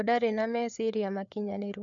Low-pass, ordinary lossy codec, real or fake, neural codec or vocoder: 7.2 kHz; none; fake; codec, 16 kHz, 4 kbps, FreqCodec, larger model